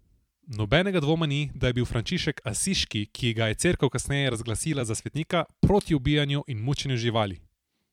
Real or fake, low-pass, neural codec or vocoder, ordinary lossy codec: fake; 19.8 kHz; vocoder, 44.1 kHz, 128 mel bands every 256 samples, BigVGAN v2; MP3, 96 kbps